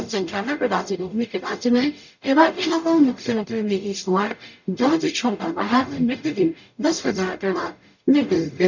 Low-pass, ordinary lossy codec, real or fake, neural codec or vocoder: 7.2 kHz; none; fake; codec, 44.1 kHz, 0.9 kbps, DAC